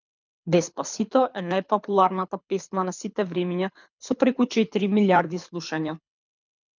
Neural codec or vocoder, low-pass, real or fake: codec, 24 kHz, 6 kbps, HILCodec; 7.2 kHz; fake